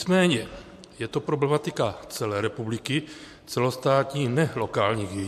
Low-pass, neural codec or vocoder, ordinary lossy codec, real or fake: 14.4 kHz; vocoder, 44.1 kHz, 128 mel bands every 512 samples, BigVGAN v2; MP3, 64 kbps; fake